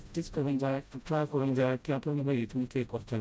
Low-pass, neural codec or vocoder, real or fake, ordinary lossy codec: none; codec, 16 kHz, 0.5 kbps, FreqCodec, smaller model; fake; none